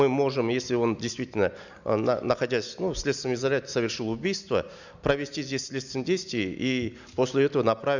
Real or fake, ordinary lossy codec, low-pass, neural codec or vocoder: real; none; 7.2 kHz; none